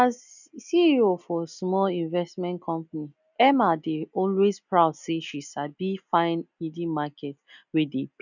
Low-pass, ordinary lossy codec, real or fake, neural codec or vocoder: 7.2 kHz; none; real; none